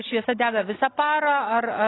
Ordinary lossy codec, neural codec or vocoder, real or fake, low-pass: AAC, 16 kbps; none; real; 7.2 kHz